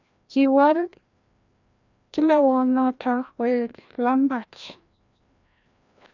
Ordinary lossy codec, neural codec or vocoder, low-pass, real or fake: none; codec, 16 kHz, 1 kbps, FreqCodec, larger model; 7.2 kHz; fake